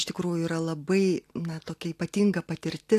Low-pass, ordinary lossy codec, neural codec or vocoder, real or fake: 14.4 kHz; AAC, 64 kbps; none; real